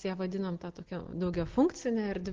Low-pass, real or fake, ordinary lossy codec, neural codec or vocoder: 7.2 kHz; real; Opus, 16 kbps; none